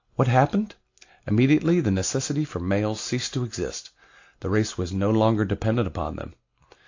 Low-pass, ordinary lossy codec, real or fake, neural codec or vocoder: 7.2 kHz; AAC, 48 kbps; real; none